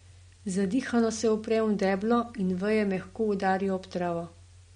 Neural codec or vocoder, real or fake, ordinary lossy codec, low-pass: none; real; MP3, 48 kbps; 9.9 kHz